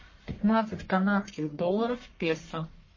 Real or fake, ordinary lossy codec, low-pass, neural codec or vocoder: fake; MP3, 32 kbps; 7.2 kHz; codec, 44.1 kHz, 1.7 kbps, Pupu-Codec